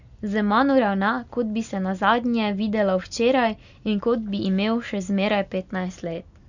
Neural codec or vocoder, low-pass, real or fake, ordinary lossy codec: none; 7.2 kHz; real; none